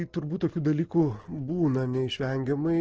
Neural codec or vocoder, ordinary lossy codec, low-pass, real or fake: none; Opus, 16 kbps; 7.2 kHz; real